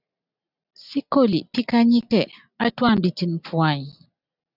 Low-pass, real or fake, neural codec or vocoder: 5.4 kHz; real; none